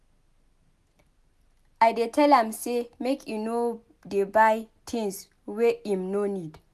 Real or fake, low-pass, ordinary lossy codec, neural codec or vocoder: real; 14.4 kHz; none; none